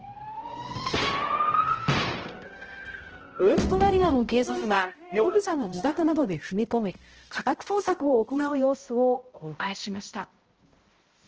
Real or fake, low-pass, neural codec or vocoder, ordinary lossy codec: fake; 7.2 kHz; codec, 16 kHz, 0.5 kbps, X-Codec, HuBERT features, trained on balanced general audio; Opus, 16 kbps